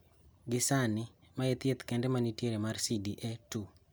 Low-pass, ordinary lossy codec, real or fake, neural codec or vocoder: none; none; real; none